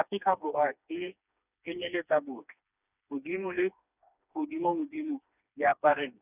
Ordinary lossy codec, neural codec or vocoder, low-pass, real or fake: none; codec, 16 kHz, 2 kbps, FreqCodec, smaller model; 3.6 kHz; fake